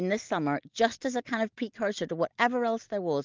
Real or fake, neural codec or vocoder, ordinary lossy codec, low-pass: real; none; Opus, 32 kbps; 7.2 kHz